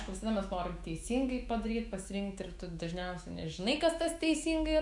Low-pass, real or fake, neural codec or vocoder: 10.8 kHz; fake; autoencoder, 48 kHz, 128 numbers a frame, DAC-VAE, trained on Japanese speech